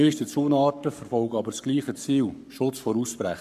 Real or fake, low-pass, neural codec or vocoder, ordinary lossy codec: fake; 14.4 kHz; codec, 44.1 kHz, 7.8 kbps, Pupu-Codec; MP3, 96 kbps